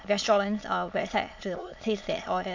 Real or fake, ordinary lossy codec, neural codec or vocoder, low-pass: fake; AAC, 48 kbps; autoencoder, 22.05 kHz, a latent of 192 numbers a frame, VITS, trained on many speakers; 7.2 kHz